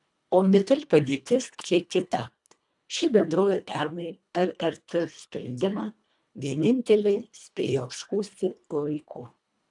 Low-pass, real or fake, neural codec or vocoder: 10.8 kHz; fake; codec, 24 kHz, 1.5 kbps, HILCodec